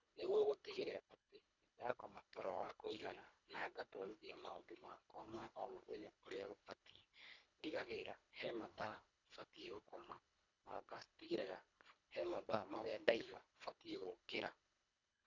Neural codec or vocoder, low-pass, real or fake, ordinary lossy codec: codec, 24 kHz, 1.5 kbps, HILCodec; 7.2 kHz; fake; none